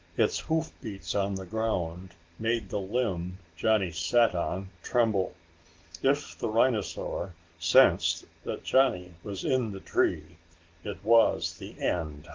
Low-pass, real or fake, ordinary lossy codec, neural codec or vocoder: 7.2 kHz; real; Opus, 16 kbps; none